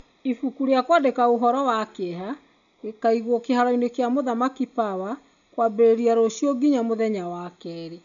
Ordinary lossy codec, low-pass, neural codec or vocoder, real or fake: none; 7.2 kHz; none; real